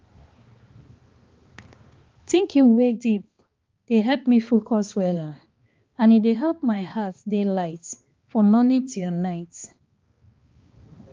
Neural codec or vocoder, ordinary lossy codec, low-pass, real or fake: codec, 16 kHz, 2 kbps, X-Codec, HuBERT features, trained on balanced general audio; Opus, 32 kbps; 7.2 kHz; fake